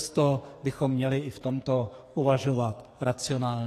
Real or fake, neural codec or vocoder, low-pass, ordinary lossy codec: fake; codec, 44.1 kHz, 2.6 kbps, SNAC; 14.4 kHz; AAC, 48 kbps